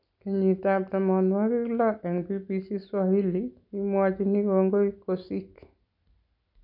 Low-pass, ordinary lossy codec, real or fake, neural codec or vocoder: 5.4 kHz; none; real; none